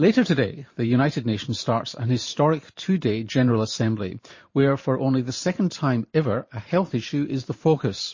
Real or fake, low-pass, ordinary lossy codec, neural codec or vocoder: fake; 7.2 kHz; MP3, 32 kbps; vocoder, 44.1 kHz, 128 mel bands every 512 samples, BigVGAN v2